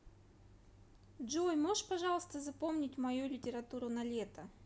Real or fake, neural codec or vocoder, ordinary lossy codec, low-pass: real; none; none; none